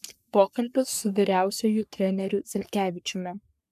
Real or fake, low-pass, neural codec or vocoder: fake; 14.4 kHz; codec, 44.1 kHz, 3.4 kbps, Pupu-Codec